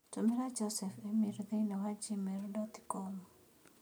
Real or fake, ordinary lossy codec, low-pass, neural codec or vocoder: real; none; none; none